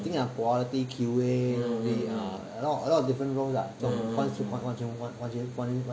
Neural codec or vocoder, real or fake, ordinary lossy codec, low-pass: none; real; none; none